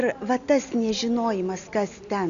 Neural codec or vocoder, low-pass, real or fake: none; 7.2 kHz; real